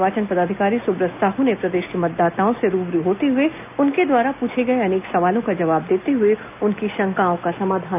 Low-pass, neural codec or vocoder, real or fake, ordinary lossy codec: 3.6 kHz; none; real; none